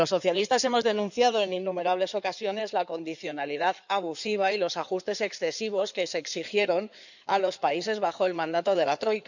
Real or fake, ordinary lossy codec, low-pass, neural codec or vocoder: fake; none; 7.2 kHz; codec, 16 kHz in and 24 kHz out, 2.2 kbps, FireRedTTS-2 codec